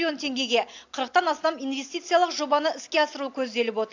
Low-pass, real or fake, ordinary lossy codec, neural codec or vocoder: 7.2 kHz; real; AAC, 48 kbps; none